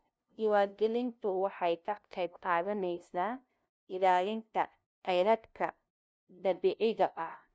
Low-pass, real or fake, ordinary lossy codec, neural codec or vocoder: none; fake; none; codec, 16 kHz, 0.5 kbps, FunCodec, trained on LibriTTS, 25 frames a second